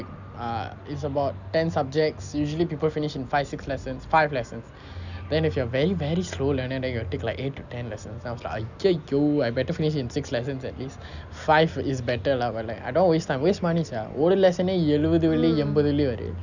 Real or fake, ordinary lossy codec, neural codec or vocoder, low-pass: real; none; none; 7.2 kHz